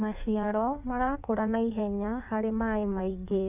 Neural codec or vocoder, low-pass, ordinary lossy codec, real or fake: codec, 16 kHz in and 24 kHz out, 1.1 kbps, FireRedTTS-2 codec; 3.6 kHz; none; fake